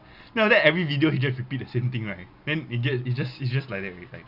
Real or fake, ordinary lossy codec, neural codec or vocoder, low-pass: real; none; none; 5.4 kHz